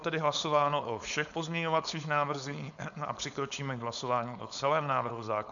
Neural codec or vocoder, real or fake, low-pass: codec, 16 kHz, 4.8 kbps, FACodec; fake; 7.2 kHz